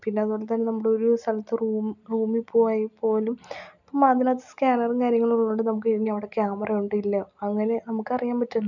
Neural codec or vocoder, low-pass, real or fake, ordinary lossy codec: none; 7.2 kHz; real; none